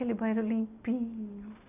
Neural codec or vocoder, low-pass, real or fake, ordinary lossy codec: none; 3.6 kHz; real; none